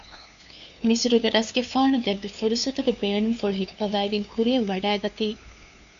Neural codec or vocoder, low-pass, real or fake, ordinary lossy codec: codec, 16 kHz, 2 kbps, FunCodec, trained on LibriTTS, 25 frames a second; 7.2 kHz; fake; Opus, 64 kbps